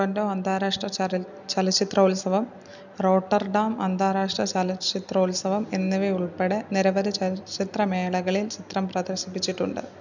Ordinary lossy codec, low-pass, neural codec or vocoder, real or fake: none; 7.2 kHz; none; real